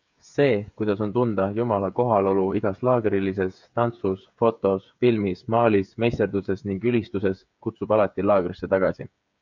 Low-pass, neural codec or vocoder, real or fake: 7.2 kHz; codec, 16 kHz, 8 kbps, FreqCodec, smaller model; fake